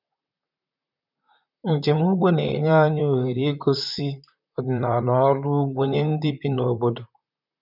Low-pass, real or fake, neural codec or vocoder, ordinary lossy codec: 5.4 kHz; fake; vocoder, 44.1 kHz, 128 mel bands, Pupu-Vocoder; none